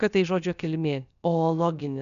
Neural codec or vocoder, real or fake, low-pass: codec, 16 kHz, about 1 kbps, DyCAST, with the encoder's durations; fake; 7.2 kHz